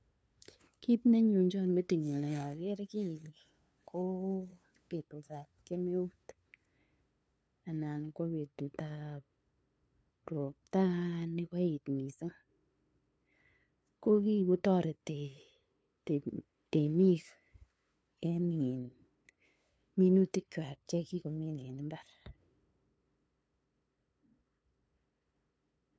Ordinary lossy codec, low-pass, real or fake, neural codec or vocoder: none; none; fake; codec, 16 kHz, 2 kbps, FunCodec, trained on LibriTTS, 25 frames a second